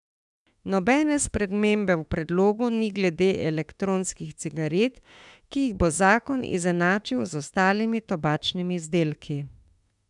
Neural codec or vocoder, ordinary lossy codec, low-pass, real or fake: autoencoder, 48 kHz, 32 numbers a frame, DAC-VAE, trained on Japanese speech; none; 10.8 kHz; fake